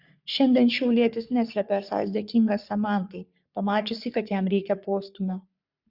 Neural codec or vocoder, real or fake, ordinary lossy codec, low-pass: codec, 44.1 kHz, 3.4 kbps, Pupu-Codec; fake; Opus, 64 kbps; 5.4 kHz